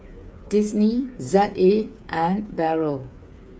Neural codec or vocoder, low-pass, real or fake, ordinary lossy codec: codec, 16 kHz, 8 kbps, FreqCodec, smaller model; none; fake; none